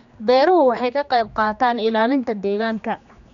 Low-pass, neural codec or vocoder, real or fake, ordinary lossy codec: 7.2 kHz; codec, 16 kHz, 2 kbps, X-Codec, HuBERT features, trained on general audio; fake; none